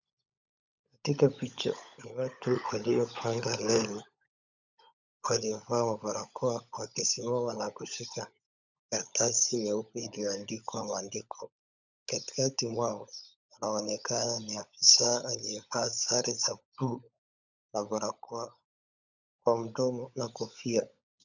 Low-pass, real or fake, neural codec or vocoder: 7.2 kHz; fake; codec, 16 kHz, 16 kbps, FunCodec, trained on LibriTTS, 50 frames a second